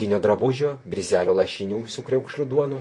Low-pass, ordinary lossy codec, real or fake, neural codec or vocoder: 10.8 kHz; MP3, 48 kbps; fake; vocoder, 44.1 kHz, 128 mel bands, Pupu-Vocoder